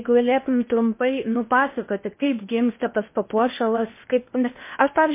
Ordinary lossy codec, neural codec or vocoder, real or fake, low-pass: MP3, 24 kbps; codec, 16 kHz in and 24 kHz out, 0.8 kbps, FocalCodec, streaming, 65536 codes; fake; 3.6 kHz